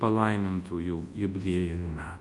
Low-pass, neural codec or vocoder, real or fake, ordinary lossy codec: 10.8 kHz; codec, 24 kHz, 0.9 kbps, WavTokenizer, large speech release; fake; AAC, 48 kbps